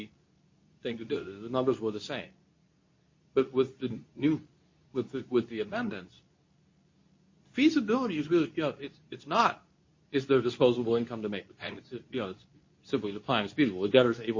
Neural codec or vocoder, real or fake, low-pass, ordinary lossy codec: codec, 24 kHz, 0.9 kbps, WavTokenizer, medium speech release version 2; fake; 7.2 kHz; MP3, 32 kbps